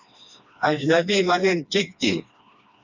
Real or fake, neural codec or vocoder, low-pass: fake; codec, 16 kHz, 2 kbps, FreqCodec, smaller model; 7.2 kHz